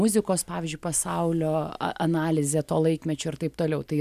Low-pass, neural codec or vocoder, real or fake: 14.4 kHz; none; real